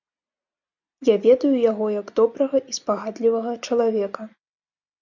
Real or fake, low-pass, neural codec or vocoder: real; 7.2 kHz; none